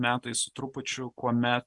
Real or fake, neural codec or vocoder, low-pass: real; none; 10.8 kHz